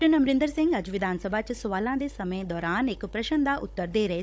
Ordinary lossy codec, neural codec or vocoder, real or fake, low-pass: none; codec, 16 kHz, 16 kbps, FunCodec, trained on Chinese and English, 50 frames a second; fake; none